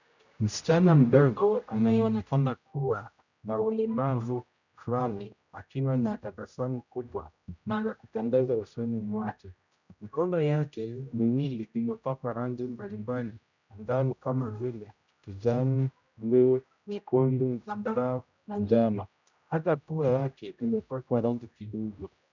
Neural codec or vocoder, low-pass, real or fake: codec, 16 kHz, 0.5 kbps, X-Codec, HuBERT features, trained on general audio; 7.2 kHz; fake